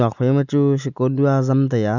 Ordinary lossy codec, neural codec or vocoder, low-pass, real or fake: none; none; 7.2 kHz; real